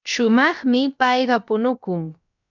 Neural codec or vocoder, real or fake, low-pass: codec, 16 kHz, about 1 kbps, DyCAST, with the encoder's durations; fake; 7.2 kHz